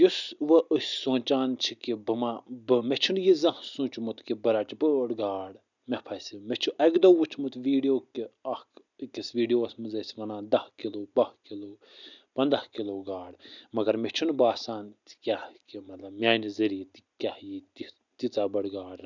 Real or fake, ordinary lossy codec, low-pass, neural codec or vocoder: real; none; 7.2 kHz; none